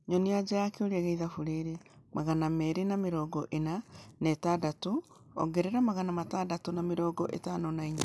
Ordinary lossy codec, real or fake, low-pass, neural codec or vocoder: none; real; none; none